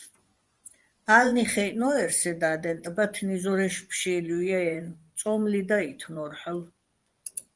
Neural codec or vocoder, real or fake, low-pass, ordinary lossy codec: vocoder, 24 kHz, 100 mel bands, Vocos; fake; 10.8 kHz; Opus, 32 kbps